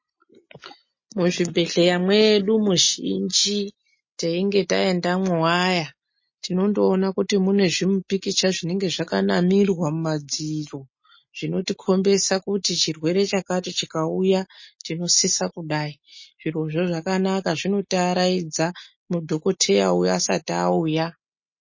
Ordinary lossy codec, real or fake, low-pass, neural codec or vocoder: MP3, 32 kbps; real; 7.2 kHz; none